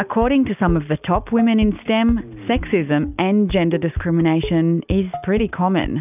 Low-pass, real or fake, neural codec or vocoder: 3.6 kHz; real; none